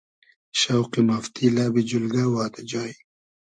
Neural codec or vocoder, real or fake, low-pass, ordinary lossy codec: none; real; 9.9 kHz; MP3, 64 kbps